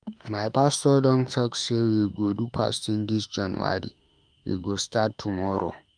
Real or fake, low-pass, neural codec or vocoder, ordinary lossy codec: fake; 9.9 kHz; autoencoder, 48 kHz, 32 numbers a frame, DAC-VAE, trained on Japanese speech; Opus, 32 kbps